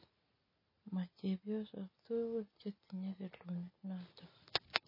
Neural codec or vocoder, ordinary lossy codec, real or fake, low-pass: none; MP3, 24 kbps; real; 5.4 kHz